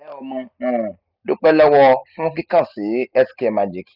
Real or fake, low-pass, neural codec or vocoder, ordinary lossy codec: real; 5.4 kHz; none; none